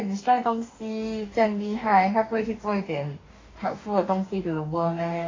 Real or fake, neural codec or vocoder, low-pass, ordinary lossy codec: fake; codec, 44.1 kHz, 2.6 kbps, DAC; 7.2 kHz; AAC, 32 kbps